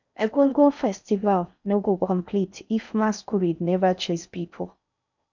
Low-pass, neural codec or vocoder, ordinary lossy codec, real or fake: 7.2 kHz; codec, 16 kHz in and 24 kHz out, 0.6 kbps, FocalCodec, streaming, 4096 codes; none; fake